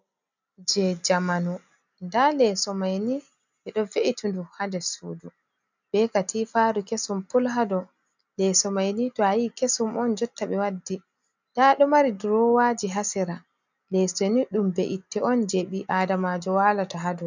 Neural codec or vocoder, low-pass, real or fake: none; 7.2 kHz; real